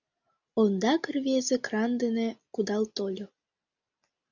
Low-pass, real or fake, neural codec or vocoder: 7.2 kHz; real; none